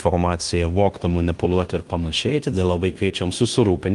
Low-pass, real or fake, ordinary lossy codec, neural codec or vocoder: 10.8 kHz; fake; Opus, 32 kbps; codec, 16 kHz in and 24 kHz out, 0.9 kbps, LongCat-Audio-Codec, fine tuned four codebook decoder